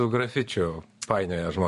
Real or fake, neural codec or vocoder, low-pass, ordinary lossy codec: fake; vocoder, 44.1 kHz, 128 mel bands every 512 samples, BigVGAN v2; 14.4 kHz; MP3, 48 kbps